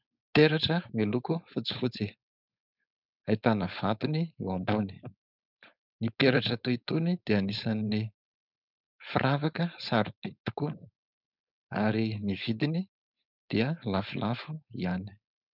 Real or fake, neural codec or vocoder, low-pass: fake; codec, 16 kHz, 4.8 kbps, FACodec; 5.4 kHz